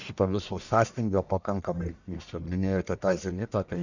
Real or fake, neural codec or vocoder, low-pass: fake; codec, 44.1 kHz, 1.7 kbps, Pupu-Codec; 7.2 kHz